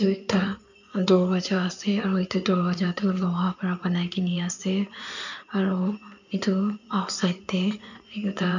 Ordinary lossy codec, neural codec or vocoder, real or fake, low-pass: none; codec, 16 kHz in and 24 kHz out, 2.2 kbps, FireRedTTS-2 codec; fake; 7.2 kHz